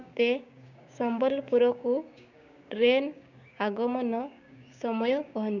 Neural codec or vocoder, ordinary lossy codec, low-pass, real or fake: codec, 16 kHz, 6 kbps, DAC; none; 7.2 kHz; fake